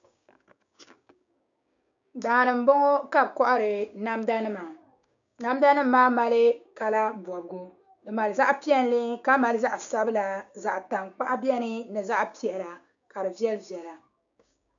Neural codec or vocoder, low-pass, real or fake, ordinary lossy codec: codec, 16 kHz, 6 kbps, DAC; 7.2 kHz; fake; MP3, 96 kbps